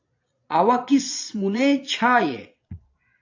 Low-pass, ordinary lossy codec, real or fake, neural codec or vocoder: 7.2 kHz; AAC, 48 kbps; fake; vocoder, 22.05 kHz, 80 mel bands, Vocos